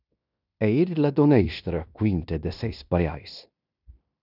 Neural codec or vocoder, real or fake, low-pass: codec, 16 kHz in and 24 kHz out, 0.9 kbps, LongCat-Audio-Codec, fine tuned four codebook decoder; fake; 5.4 kHz